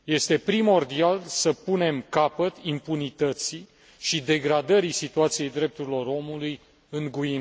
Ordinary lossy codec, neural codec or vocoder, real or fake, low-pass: none; none; real; none